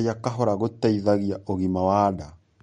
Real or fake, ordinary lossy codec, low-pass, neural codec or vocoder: real; MP3, 48 kbps; 9.9 kHz; none